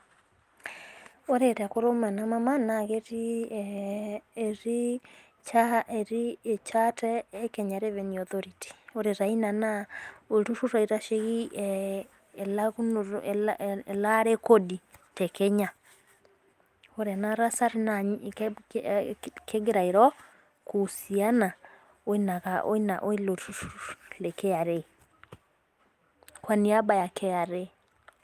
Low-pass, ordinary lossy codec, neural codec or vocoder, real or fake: 14.4 kHz; Opus, 32 kbps; none; real